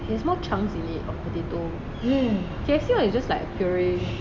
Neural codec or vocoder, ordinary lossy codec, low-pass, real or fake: none; none; none; real